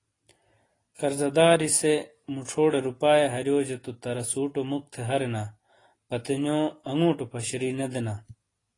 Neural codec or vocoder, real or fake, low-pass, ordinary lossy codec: none; real; 10.8 kHz; AAC, 32 kbps